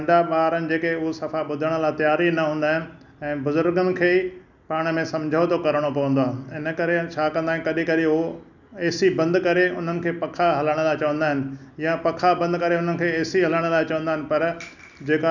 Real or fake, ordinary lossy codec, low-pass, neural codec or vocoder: real; none; 7.2 kHz; none